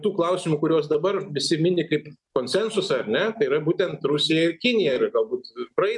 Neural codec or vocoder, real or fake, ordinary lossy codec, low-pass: none; real; MP3, 96 kbps; 10.8 kHz